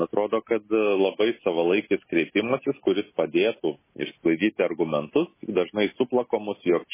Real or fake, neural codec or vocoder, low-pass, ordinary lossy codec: real; none; 3.6 kHz; MP3, 16 kbps